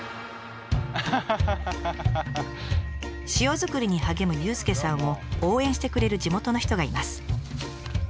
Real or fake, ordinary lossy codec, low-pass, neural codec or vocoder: real; none; none; none